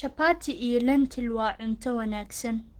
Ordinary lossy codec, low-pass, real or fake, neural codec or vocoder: Opus, 16 kbps; 19.8 kHz; fake; codec, 44.1 kHz, 7.8 kbps, DAC